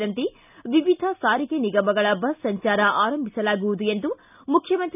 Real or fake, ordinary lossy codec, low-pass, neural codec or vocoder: real; none; 3.6 kHz; none